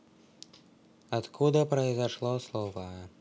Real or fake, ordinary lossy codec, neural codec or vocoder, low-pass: real; none; none; none